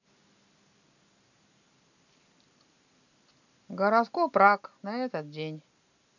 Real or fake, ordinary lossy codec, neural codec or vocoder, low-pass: real; none; none; 7.2 kHz